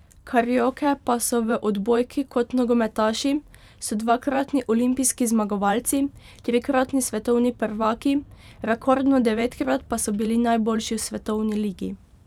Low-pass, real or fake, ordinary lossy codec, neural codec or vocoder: 19.8 kHz; fake; none; vocoder, 44.1 kHz, 128 mel bands every 512 samples, BigVGAN v2